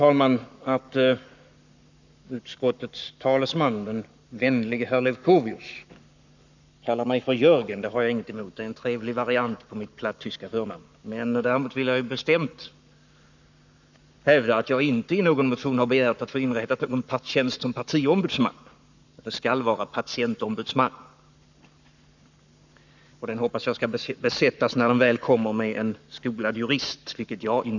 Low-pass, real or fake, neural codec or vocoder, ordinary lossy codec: 7.2 kHz; fake; codec, 44.1 kHz, 7.8 kbps, Pupu-Codec; none